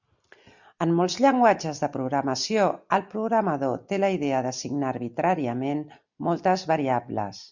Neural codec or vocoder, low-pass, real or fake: none; 7.2 kHz; real